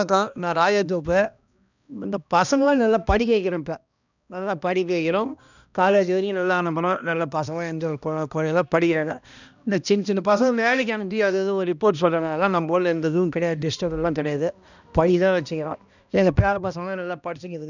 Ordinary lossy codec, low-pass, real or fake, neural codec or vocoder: none; 7.2 kHz; fake; codec, 16 kHz, 1 kbps, X-Codec, HuBERT features, trained on balanced general audio